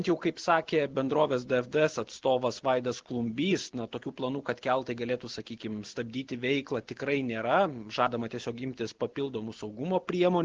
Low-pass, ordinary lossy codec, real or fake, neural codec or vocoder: 7.2 kHz; Opus, 16 kbps; real; none